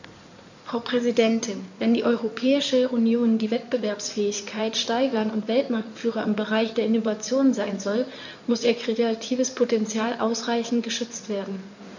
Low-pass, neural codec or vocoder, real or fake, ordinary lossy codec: 7.2 kHz; codec, 16 kHz in and 24 kHz out, 2.2 kbps, FireRedTTS-2 codec; fake; none